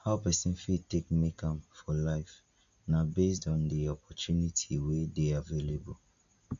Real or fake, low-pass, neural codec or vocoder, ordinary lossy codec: real; 7.2 kHz; none; MP3, 64 kbps